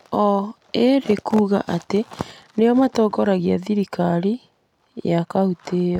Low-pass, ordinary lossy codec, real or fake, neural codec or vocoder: 19.8 kHz; none; real; none